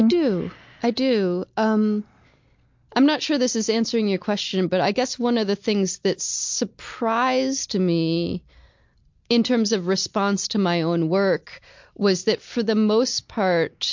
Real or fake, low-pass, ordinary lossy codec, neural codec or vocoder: real; 7.2 kHz; MP3, 48 kbps; none